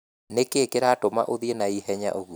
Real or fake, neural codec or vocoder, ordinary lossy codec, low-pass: real; none; none; none